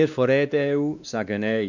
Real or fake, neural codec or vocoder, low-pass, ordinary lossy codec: fake; codec, 16 kHz, 2 kbps, X-Codec, WavLM features, trained on Multilingual LibriSpeech; 7.2 kHz; none